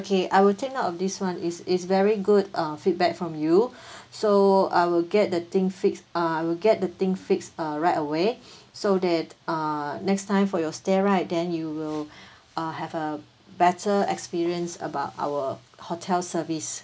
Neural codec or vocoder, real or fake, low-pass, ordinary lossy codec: none; real; none; none